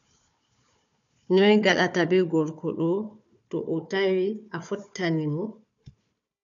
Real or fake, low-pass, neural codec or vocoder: fake; 7.2 kHz; codec, 16 kHz, 4 kbps, FunCodec, trained on Chinese and English, 50 frames a second